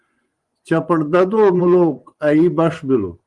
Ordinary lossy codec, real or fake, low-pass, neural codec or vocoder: Opus, 24 kbps; fake; 10.8 kHz; vocoder, 24 kHz, 100 mel bands, Vocos